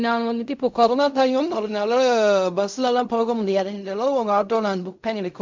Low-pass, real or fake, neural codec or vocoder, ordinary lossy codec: 7.2 kHz; fake; codec, 16 kHz in and 24 kHz out, 0.4 kbps, LongCat-Audio-Codec, fine tuned four codebook decoder; MP3, 64 kbps